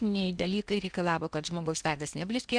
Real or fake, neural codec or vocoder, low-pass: fake; codec, 16 kHz in and 24 kHz out, 0.8 kbps, FocalCodec, streaming, 65536 codes; 9.9 kHz